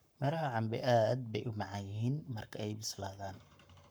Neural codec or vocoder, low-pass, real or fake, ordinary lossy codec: codec, 44.1 kHz, 7.8 kbps, Pupu-Codec; none; fake; none